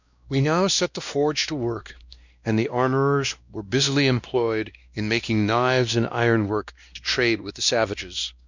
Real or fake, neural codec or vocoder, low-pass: fake; codec, 16 kHz, 1 kbps, X-Codec, WavLM features, trained on Multilingual LibriSpeech; 7.2 kHz